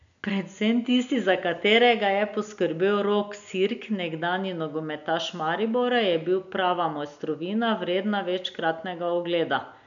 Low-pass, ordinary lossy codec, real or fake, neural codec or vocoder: 7.2 kHz; none; real; none